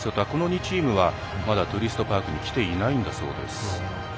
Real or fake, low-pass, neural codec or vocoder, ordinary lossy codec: real; none; none; none